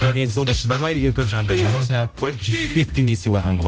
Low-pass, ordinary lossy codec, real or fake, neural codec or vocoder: none; none; fake; codec, 16 kHz, 0.5 kbps, X-Codec, HuBERT features, trained on general audio